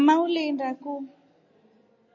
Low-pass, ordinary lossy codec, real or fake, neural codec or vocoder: 7.2 kHz; MP3, 32 kbps; real; none